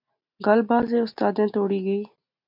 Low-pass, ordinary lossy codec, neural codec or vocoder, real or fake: 5.4 kHz; MP3, 48 kbps; none; real